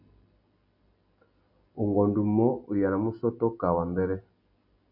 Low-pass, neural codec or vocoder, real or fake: 5.4 kHz; none; real